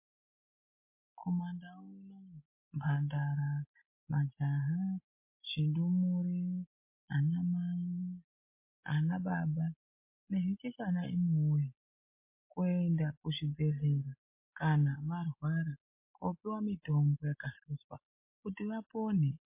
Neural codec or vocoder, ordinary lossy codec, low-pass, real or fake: none; MP3, 24 kbps; 3.6 kHz; real